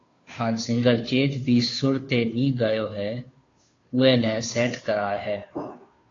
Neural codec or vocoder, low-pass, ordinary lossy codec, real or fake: codec, 16 kHz, 2 kbps, FunCodec, trained on Chinese and English, 25 frames a second; 7.2 kHz; AAC, 32 kbps; fake